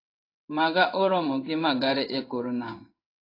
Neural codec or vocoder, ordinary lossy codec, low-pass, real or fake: codec, 16 kHz in and 24 kHz out, 1 kbps, XY-Tokenizer; AAC, 32 kbps; 5.4 kHz; fake